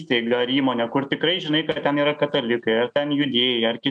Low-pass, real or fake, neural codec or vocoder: 9.9 kHz; real; none